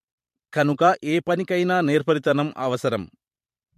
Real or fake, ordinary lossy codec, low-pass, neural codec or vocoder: fake; MP3, 64 kbps; 14.4 kHz; vocoder, 44.1 kHz, 128 mel bands every 512 samples, BigVGAN v2